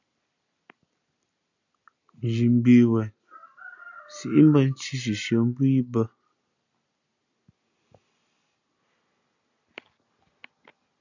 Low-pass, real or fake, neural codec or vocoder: 7.2 kHz; real; none